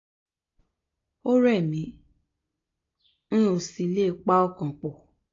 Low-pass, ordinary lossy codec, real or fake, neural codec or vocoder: 7.2 kHz; AAC, 32 kbps; real; none